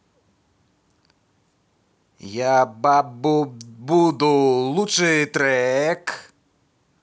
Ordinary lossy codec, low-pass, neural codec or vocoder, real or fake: none; none; none; real